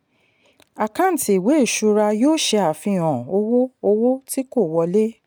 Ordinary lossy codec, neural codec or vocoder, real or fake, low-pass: none; none; real; none